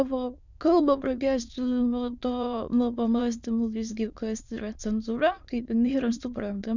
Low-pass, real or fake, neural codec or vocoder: 7.2 kHz; fake; autoencoder, 22.05 kHz, a latent of 192 numbers a frame, VITS, trained on many speakers